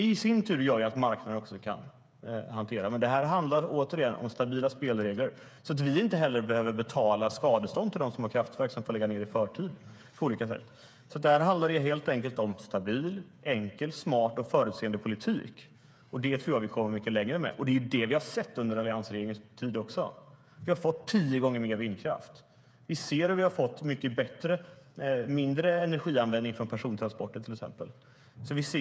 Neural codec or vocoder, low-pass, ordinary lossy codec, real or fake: codec, 16 kHz, 8 kbps, FreqCodec, smaller model; none; none; fake